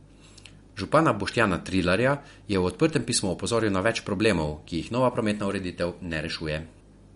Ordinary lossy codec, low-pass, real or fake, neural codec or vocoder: MP3, 48 kbps; 10.8 kHz; real; none